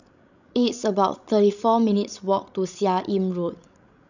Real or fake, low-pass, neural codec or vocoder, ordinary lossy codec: fake; 7.2 kHz; codec, 16 kHz, 16 kbps, FreqCodec, larger model; none